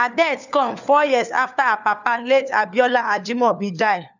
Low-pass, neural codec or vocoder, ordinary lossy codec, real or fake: 7.2 kHz; codec, 16 kHz, 4 kbps, FunCodec, trained on LibriTTS, 50 frames a second; none; fake